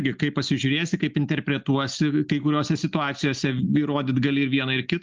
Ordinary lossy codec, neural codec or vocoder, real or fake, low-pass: Opus, 24 kbps; none; real; 7.2 kHz